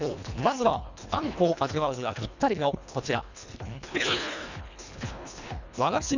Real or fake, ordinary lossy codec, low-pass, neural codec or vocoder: fake; none; 7.2 kHz; codec, 24 kHz, 1.5 kbps, HILCodec